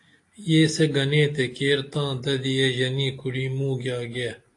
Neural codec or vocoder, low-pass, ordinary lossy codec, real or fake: none; 10.8 kHz; AAC, 48 kbps; real